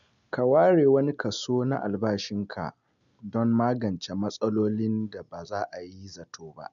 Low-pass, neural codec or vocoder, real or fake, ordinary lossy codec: 7.2 kHz; none; real; none